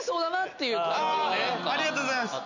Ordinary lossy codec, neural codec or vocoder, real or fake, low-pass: none; none; real; 7.2 kHz